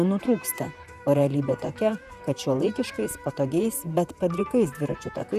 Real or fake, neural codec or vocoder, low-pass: fake; vocoder, 44.1 kHz, 128 mel bands, Pupu-Vocoder; 14.4 kHz